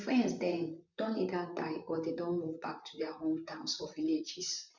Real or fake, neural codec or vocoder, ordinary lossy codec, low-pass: fake; vocoder, 44.1 kHz, 128 mel bands, Pupu-Vocoder; none; 7.2 kHz